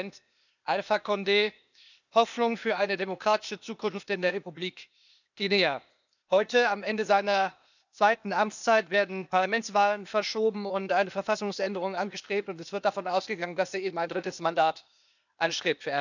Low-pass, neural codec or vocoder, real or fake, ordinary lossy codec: 7.2 kHz; codec, 16 kHz, 0.8 kbps, ZipCodec; fake; none